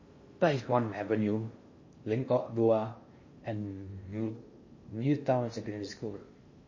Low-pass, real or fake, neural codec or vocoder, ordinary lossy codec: 7.2 kHz; fake; codec, 16 kHz in and 24 kHz out, 0.6 kbps, FocalCodec, streaming, 4096 codes; MP3, 32 kbps